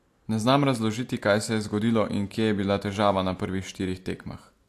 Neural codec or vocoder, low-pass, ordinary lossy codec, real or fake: none; 14.4 kHz; AAC, 64 kbps; real